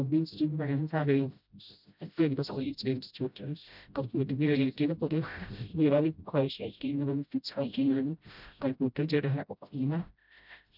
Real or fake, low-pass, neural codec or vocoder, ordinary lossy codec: fake; 5.4 kHz; codec, 16 kHz, 0.5 kbps, FreqCodec, smaller model; none